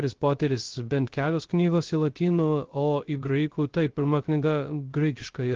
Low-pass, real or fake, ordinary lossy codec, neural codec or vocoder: 7.2 kHz; fake; Opus, 16 kbps; codec, 16 kHz, 0.3 kbps, FocalCodec